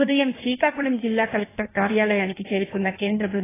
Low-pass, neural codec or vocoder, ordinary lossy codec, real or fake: 3.6 kHz; codec, 16 kHz in and 24 kHz out, 1.1 kbps, FireRedTTS-2 codec; AAC, 16 kbps; fake